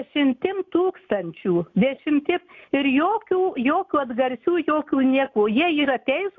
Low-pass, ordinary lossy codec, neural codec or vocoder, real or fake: 7.2 kHz; MP3, 64 kbps; none; real